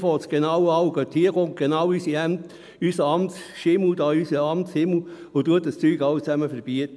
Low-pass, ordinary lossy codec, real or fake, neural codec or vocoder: none; none; real; none